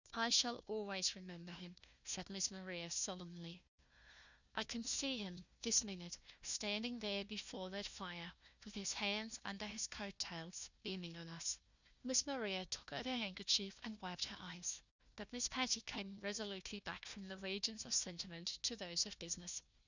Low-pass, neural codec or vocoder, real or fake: 7.2 kHz; codec, 16 kHz, 1 kbps, FunCodec, trained on Chinese and English, 50 frames a second; fake